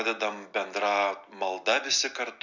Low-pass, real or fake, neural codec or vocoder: 7.2 kHz; real; none